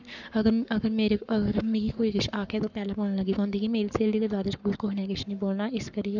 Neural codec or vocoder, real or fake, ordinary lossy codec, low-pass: codec, 44.1 kHz, 7.8 kbps, Pupu-Codec; fake; none; 7.2 kHz